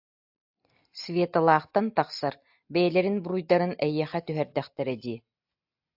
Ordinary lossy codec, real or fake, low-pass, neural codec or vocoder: AAC, 48 kbps; real; 5.4 kHz; none